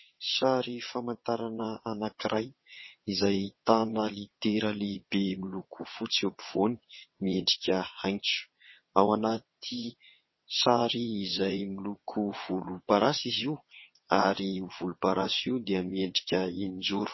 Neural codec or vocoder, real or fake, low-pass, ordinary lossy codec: vocoder, 22.05 kHz, 80 mel bands, WaveNeXt; fake; 7.2 kHz; MP3, 24 kbps